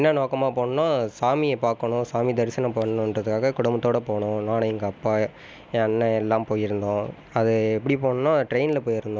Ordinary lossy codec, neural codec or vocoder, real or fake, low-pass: none; none; real; none